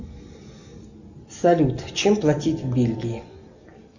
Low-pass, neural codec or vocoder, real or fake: 7.2 kHz; none; real